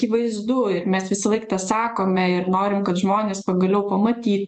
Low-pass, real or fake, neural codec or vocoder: 10.8 kHz; fake; vocoder, 24 kHz, 100 mel bands, Vocos